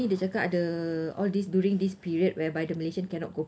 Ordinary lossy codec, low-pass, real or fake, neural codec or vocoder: none; none; real; none